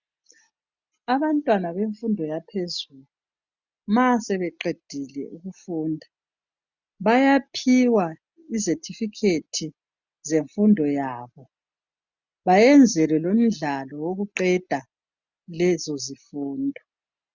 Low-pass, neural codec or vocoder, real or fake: 7.2 kHz; none; real